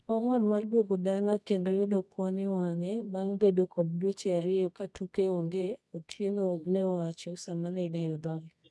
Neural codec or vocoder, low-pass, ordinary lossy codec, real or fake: codec, 24 kHz, 0.9 kbps, WavTokenizer, medium music audio release; none; none; fake